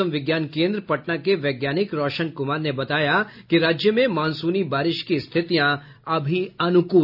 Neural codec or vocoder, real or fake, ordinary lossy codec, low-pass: none; real; none; 5.4 kHz